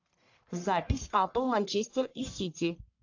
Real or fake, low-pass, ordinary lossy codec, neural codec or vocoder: fake; 7.2 kHz; AAC, 48 kbps; codec, 44.1 kHz, 1.7 kbps, Pupu-Codec